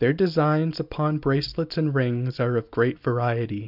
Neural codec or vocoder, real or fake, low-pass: none; real; 5.4 kHz